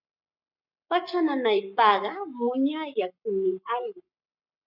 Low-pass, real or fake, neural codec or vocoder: 5.4 kHz; fake; codec, 44.1 kHz, 7.8 kbps, Pupu-Codec